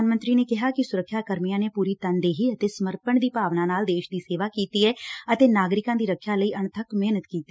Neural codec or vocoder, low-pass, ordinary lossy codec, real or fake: none; none; none; real